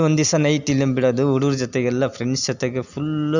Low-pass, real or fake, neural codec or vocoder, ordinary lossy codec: 7.2 kHz; real; none; none